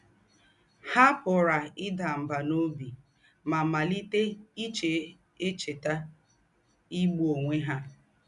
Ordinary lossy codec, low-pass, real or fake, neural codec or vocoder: none; 10.8 kHz; real; none